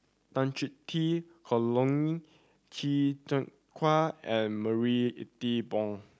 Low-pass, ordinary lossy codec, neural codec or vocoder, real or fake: none; none; none; real